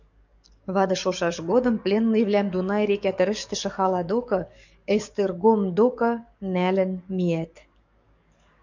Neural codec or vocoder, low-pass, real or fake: codec, 44.1 kHz, 7.8 kbps, DAC; 7.2 kHz; fake